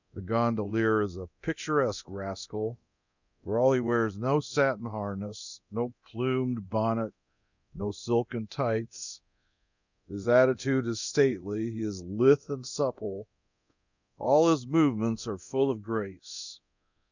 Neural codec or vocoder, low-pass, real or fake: codec, 24 kHz, 0.9 kbps, DualCodec; 7.2 kHz; fake